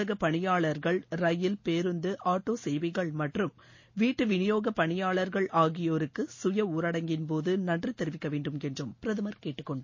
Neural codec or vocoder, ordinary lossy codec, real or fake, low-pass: none; MP3, 32 kbps; real; 7.2 kHz